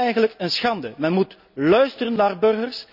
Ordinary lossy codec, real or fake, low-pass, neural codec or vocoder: none; real; 5.4 kHz; none